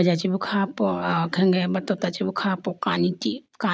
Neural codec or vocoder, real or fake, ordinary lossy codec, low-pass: none; real; none; none